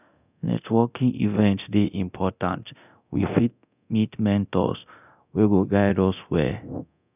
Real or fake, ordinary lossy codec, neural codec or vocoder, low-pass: fake; none; codec, 24 kHz, 0.9 kbps, DualCodec; 3.6 kHz